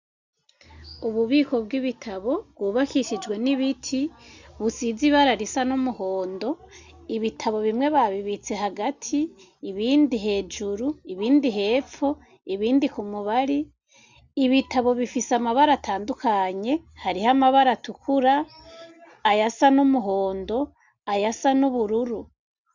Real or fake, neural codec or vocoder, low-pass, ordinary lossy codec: real; none; 7.2 kHz; Opus, 64 kbps